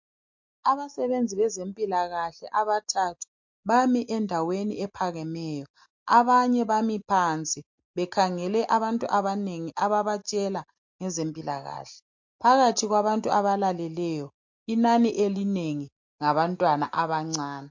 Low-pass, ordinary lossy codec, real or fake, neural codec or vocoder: 7.2 kHz; MP3, 48 kbps; real; none